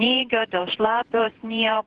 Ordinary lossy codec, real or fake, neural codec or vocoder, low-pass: Opus, 16 kbps; fake; vocoder, 48 kHz, 128 mel bands, Vocos; 10.8 kHz